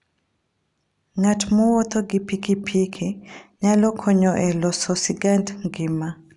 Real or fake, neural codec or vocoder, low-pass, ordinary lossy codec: real; none; 10.8 kHz; none